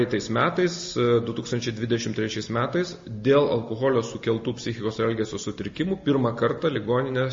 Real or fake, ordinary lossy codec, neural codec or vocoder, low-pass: real; MP3, 32 kbps; none; 7.2 kHz